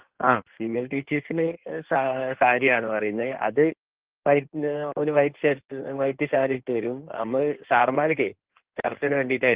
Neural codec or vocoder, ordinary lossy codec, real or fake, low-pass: codec, 16 kHz in and 24 kHz out, 1.1 kbps, FireRedTTS-2 codec; Opus, 16 kbps; fake; 3.6 kHz